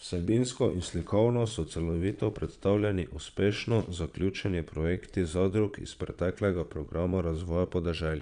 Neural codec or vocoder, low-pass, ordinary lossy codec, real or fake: vocoder, 22.05 kHz, 80 mel bands, Vocos; 9.9 kHz; none; fake